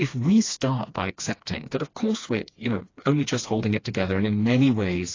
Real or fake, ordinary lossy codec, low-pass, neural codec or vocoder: fake; AAC, 32 kbps; 7.2 kHz; codec, 16 kHz, 2 kbps, FreqCodec, smaller model